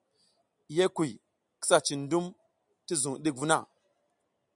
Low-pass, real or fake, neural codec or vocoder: 10.8 kHz; real; none